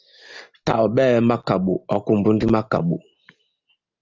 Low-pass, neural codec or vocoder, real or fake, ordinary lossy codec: 7.2 kHz; none; real; Opus, 32 kbps